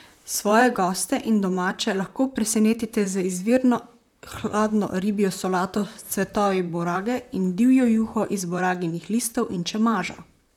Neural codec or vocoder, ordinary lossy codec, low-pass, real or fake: vocoder, 44.1 kHz, 128 mel bands, Pupu-Vocoder; none; 19.8 kHz; fake